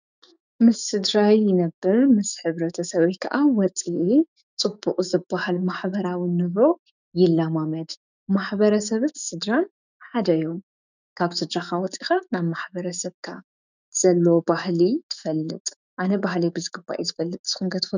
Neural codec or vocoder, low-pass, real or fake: autoencoder, 48 kHz, 128 numbers a frame, DAC-VAE, trained on Japanese speech; 7.2 kHz; fake